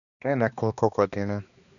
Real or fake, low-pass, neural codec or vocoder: fake; 7.2 kHz; codec, 16 kHz, 2 kbps, X-Codec, HuBERT features, trained on balanced general audio